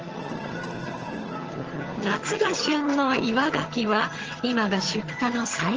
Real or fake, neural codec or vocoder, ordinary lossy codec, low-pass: fake; vocoder, 22.05 kHz, 80 mel bands, HiFi-GAN; Opus, 16 kbps; 7.2 kHz